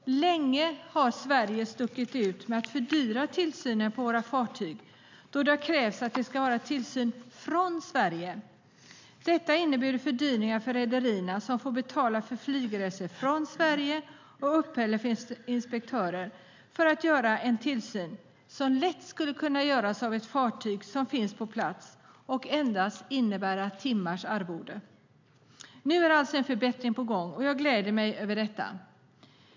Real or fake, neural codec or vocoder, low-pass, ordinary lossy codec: real; none; 7.2 kHz; MP3, 64 kbps